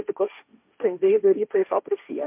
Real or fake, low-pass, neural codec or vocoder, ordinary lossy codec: fake; 3.6 kHz; codec, 16 kHz, 1.1 kbps, Voila-Tokenizer; MP3, 32 kbps